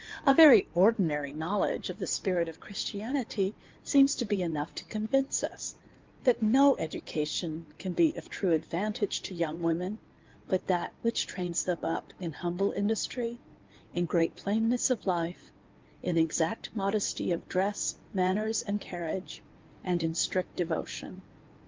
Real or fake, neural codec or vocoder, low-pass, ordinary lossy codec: fake; codec, 16 kHz in and 24 kHz out, 2.2 kbps, FireRedTTS-2 codec; 7.2 kHz; Opus, 32 kbps